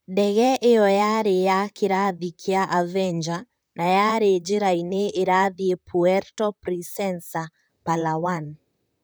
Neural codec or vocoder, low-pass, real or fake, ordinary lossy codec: vocoder, 44.1 kHz, 128 mel bands, Pupu-Vocoder; none; fake; none